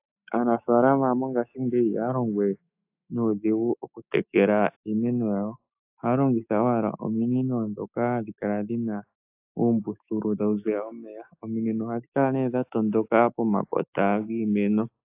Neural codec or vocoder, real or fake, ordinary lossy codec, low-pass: autoencoder, 48 kHz, 128 numbers a frame, DAC-VAE, trained on Japanese speech; fake; AAC, 32 kbps; 3.6 kHz